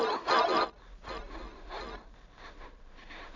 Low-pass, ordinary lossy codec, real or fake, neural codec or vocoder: 7.2 kHz; none; fake; codec, 16 kHz in and 24 kHz out, 0.4 kbps, LongCat-Audio-Codec, two codebook decoder